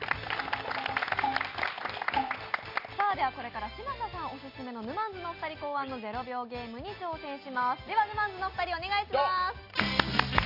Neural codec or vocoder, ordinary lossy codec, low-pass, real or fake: none; Opus, 64 kbps; 5.4 kHz; real